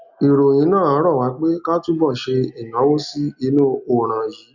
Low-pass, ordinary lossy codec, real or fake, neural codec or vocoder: 7.2 kHz; none; real; none